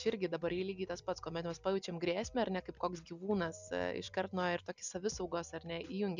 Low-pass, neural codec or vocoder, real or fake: 7.2 kHz; none; real